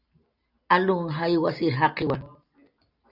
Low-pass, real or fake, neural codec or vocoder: 5.4 kHz; real; none